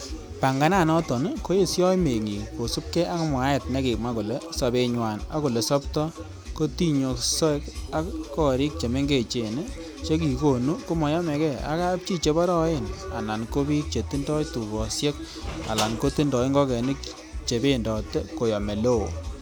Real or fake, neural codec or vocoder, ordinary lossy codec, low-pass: real; none; none; none